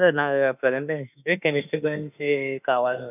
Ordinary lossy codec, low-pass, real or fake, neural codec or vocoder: none; 3.6 kHz; fake; autoencoder, 48 kHz, 32 numbers a frame, DAC-VAE, trained on Japanese speech